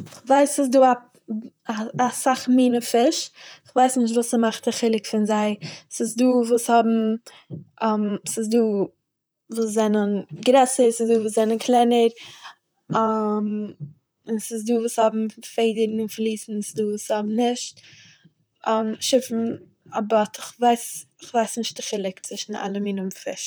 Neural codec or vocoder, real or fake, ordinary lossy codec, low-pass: vocoder, 44.1 kHz, 128 mel bands, Pupu-Vocoder; fake; none; none